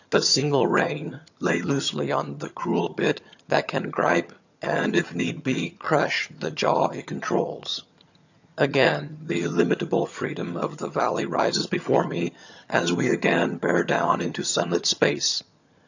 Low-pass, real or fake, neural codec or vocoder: 7.2 kHz; fake; vocoder, 22.05 kHz, 80 mel bands, HiFi-GAN